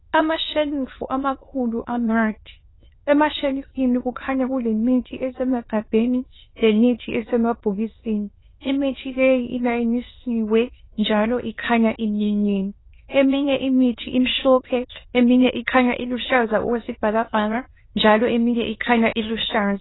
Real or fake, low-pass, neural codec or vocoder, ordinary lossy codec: fake; 7.2 kHz; autoencoder, 22.05 kHz, a latent of 192 numbers a frame, VITS, trained on many speakers; AAC, 16 kbps